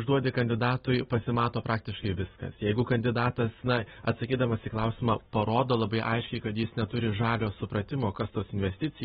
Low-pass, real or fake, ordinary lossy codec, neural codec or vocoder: 19.8 kHz; real; AAC, 16 kbps; none